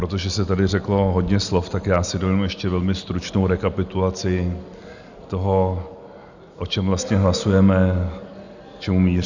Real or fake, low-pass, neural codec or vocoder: real; 7.2 kHz; none